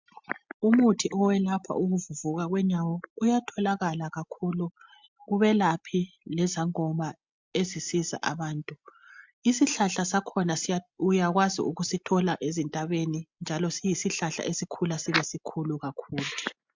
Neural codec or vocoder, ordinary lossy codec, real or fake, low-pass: none; MP3, 64 kbps; real; 7.2 kHz